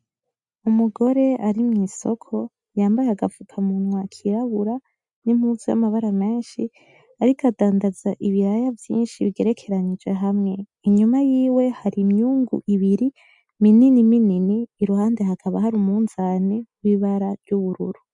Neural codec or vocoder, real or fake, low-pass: none; real; 10.8 kHz